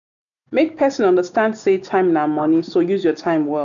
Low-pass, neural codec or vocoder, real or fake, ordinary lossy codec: 7.2 kHz; none; real; none